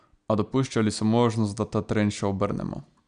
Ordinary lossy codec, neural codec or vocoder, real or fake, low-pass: none; none; real; 9.9 kHz